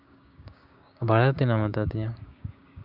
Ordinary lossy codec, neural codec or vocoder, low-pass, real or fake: none; codec, 16 kHz, 6 kbps, DAC; 5.4 kHz; fake